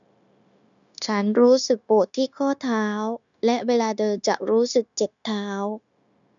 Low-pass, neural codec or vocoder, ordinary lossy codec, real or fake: 7.2 kHz; codec, 16 kHz, 0.9 kbps, LongCat-Audio-Codec; none; fake